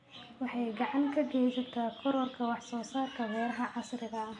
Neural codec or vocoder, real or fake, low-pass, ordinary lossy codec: none; real; 10.8 kHz; MP3, 64 kbps